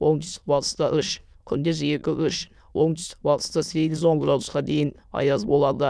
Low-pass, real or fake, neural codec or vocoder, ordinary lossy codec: none; fake; autoencoder, 22.05 kHz, a latent of 192 numbers a frame, VITS, trained on many speakers; none